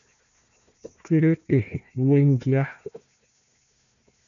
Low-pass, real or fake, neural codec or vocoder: 7.2 kHz; fake; codec, 16 kHz, 1 kbps, FunCodec, trained on Chinese and English, 50 frames a second